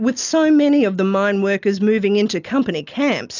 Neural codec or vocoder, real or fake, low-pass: none; real; 7.2 kHz